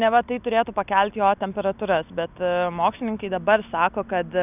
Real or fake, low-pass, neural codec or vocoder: real; 3.6 kHz; none